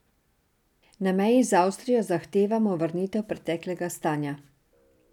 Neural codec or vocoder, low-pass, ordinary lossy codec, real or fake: none; 19.8 kHz; none; real